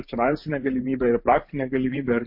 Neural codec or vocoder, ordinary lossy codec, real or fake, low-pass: vocoder, 44.1 kHz, 128 mel bands every 512 samples, BigVGAN v2; MP3, 32 kbps; fake; 5.4 kHz